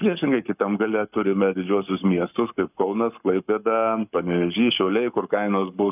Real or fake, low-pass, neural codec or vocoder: fake; 3.6 kHz; codec, 44.1 kHz, 7.8 kbps, Pupu-Codec